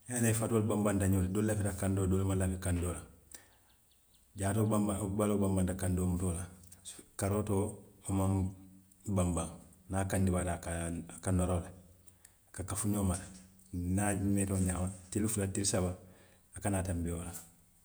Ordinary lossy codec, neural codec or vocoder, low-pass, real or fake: none; vocoder, 48 kHz, 128 mel bands, Vocos; none; fake